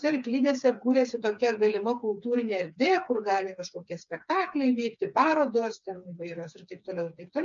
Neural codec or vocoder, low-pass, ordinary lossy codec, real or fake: codec, 16 kHz, 4 kbps, FreqCodec, smaller model; 7.2 kHz; MP3, 64 kbps; fake